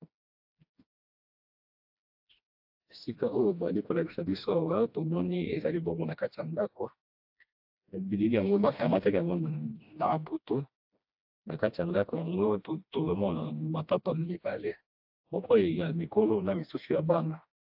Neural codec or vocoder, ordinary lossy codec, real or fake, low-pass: codec, 16 kHz, 1 kbps, FreqCodec, smaller model; AAC, 48 kbps; fake; 5.4 kHz